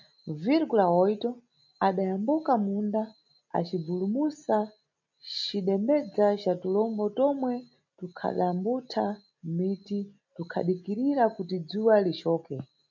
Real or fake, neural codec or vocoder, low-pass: real; none; 7.2 kHz